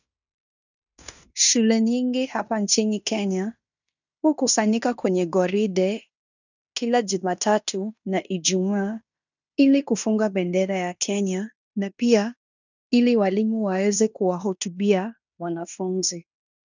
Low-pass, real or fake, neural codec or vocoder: 7.2 kHz; fake; codec, 16 kHz in and 24 kHz out, 0.9 kbps, LongCat-Audio-Codec, fine tuned four codebook decoder